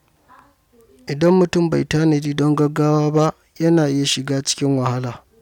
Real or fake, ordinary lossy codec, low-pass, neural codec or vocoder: real; none; 19.8 kHz; none